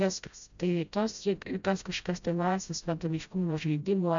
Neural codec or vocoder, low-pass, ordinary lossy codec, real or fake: codec, 16 kHz, 0.5 kbps, FreqCodec, smaller model; 7.2 kHz; MP3, 64 kbps; fake